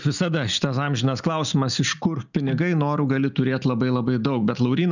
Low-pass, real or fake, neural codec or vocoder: 7.2 kHz; real; none